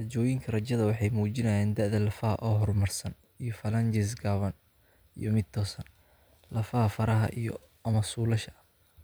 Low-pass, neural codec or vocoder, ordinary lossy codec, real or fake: none; none; none; real